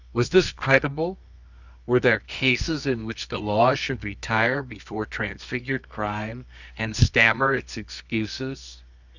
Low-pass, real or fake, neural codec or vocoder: 7.2 kHz; fake; codec, 24 kHz, 0.9 kbps, WavTokenizer, medium music audio release